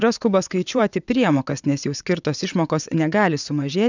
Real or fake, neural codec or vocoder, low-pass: fake; vocoder, 22.05 kHz, 80 mel bands, WaveNeXt; 7.2 kHz